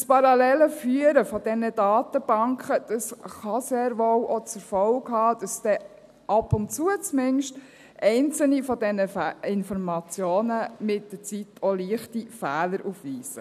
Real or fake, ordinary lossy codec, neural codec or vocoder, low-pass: real; none; none; 14.4 kHz